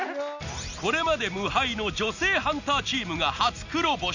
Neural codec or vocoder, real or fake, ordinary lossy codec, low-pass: none; real; none; 7.2 kHz